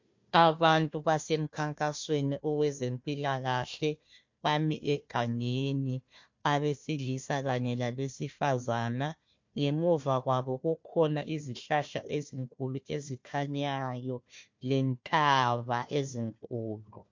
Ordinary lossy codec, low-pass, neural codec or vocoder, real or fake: MP3, 48 kbps; 7.2 kHz; codec, 16 kHz, 1 kbps, FunCodec, trained on Chinese and English, 50 frames a second; fake